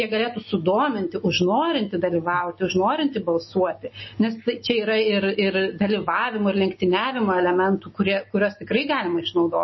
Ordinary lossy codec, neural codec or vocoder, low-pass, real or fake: MP3, 24 kbps; none; 7.2 kHz; real